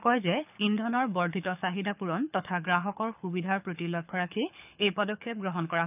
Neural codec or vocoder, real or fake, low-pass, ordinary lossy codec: codec, 24 kHz, 6 kbps, HILCodec; fake; 3.6 kHz; none